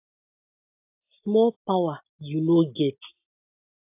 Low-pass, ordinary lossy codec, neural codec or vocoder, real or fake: 3.6 kHz; AAC, 32 kbps; none; real